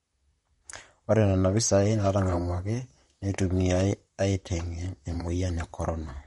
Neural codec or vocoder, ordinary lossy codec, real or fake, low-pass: vocoder, 44.1 kHz, 128 mel bands, Pupu-Vocoder; MP3, 48 kbps; fake; 19.8 kHz